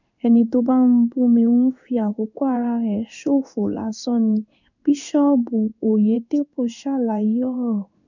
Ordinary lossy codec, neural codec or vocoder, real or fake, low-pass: none; codec, 16 kHz in and 24 kHz out, 1 kbps, XY-Tokenizer; fake; 7.2 kHz